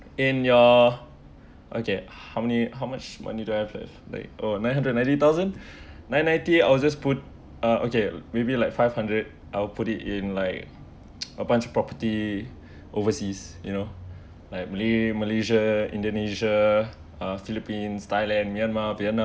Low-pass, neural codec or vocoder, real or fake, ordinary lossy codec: none; none; real; none